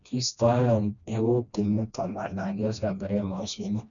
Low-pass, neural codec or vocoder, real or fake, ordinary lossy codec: 7.2 kHz; codec, 16 kHz, 1 kbps, FreqCodec, smaller model; fake; none